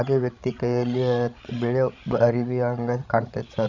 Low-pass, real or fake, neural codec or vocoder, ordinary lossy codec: 7.2 kHz; fake; codec, 16 kHz, 16 kbps, FreqCodec, larger model; none